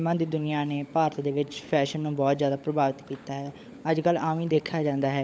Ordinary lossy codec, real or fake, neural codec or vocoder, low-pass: none; fake; codec, 16 kHz, 16 kbps, FunCodec, trained on LibriTTS, 50 frames a second; none